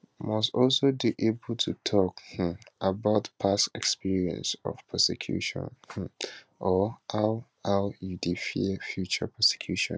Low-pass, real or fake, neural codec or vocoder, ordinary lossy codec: none; real; none; none